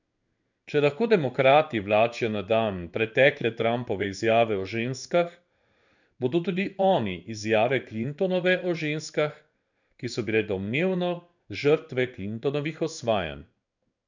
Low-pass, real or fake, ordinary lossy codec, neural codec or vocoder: 7.2 kHz; fake; none; codec, 16 kHz in and 24 kHz out, 1 kbps, XY-Tokenizer